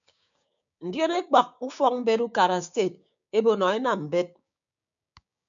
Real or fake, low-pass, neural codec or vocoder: fake; 7.2 kHz; codec, 16 kHz, 6 kbps, DAC